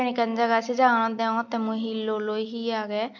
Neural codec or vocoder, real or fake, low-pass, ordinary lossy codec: none; real; 7.2 kHz; MP3, 48 kbps